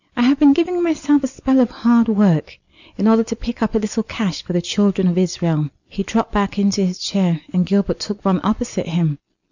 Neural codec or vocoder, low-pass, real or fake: none; 7.2 kHz; real